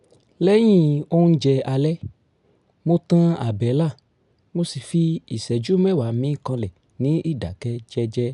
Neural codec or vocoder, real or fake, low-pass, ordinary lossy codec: none; real; 10.8 kHz; none